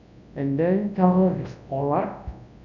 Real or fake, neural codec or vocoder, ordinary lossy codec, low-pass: fake; codec, 24 kHz, 0.9 kbps, WavTokenizer, large speech release; none; 7.2 kHz